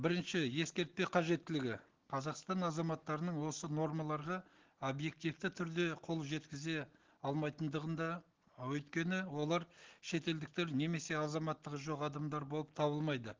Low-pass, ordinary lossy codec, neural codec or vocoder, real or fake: 7.2 kHz; Opus, 16 kbps; none; real